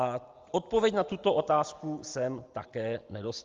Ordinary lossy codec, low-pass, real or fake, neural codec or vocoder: Opus, 32 kbps; 7.2 kHz; real; none